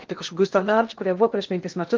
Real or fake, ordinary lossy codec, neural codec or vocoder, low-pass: fake; Opus, 32 kbps; codec, 16 kHz in and 24 kHz out, 0.6 kbps, FocalCodec, streaming, 2048 codes; 7.2 kHz